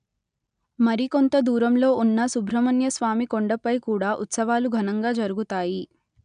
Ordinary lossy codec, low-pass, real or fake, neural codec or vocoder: none; 9.9 kHz; real; none